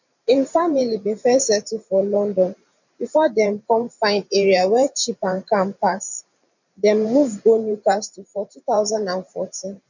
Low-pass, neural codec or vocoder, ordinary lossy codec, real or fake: 7.2 kHz; vocoder, 44.1 kHz, 128 mel bands every 512 samples, BigVGAN v2; none; fake